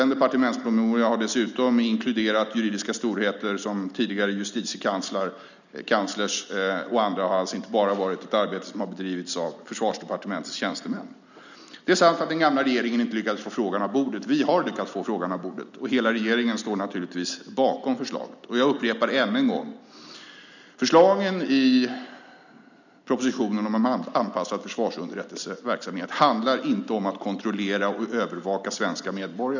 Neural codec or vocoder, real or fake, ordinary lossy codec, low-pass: none; real; none; 7.2 kHz